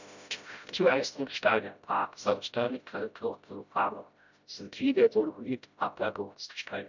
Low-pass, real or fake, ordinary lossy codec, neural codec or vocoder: 7.2 kHz; fake; none; codec, 16 kHz, 0.5 kbps, FreqCodec, smaller model